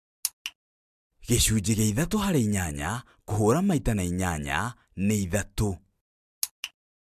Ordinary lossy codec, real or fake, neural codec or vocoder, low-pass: MP3, 96 kbps; real; none; 14.4 kHz